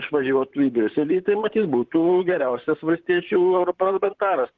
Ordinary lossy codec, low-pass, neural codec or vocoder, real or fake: Opus, 16 kbps; 7.2 kHz; vocoder, 44.1 kHz, 128 mel bands, Pupu-Vocoder; fake